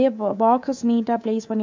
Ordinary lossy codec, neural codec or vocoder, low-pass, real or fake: MP3, 64 kbps; codec, 24 kHz, 3.1 kbps, DualCodec; 7.2 kHz; fake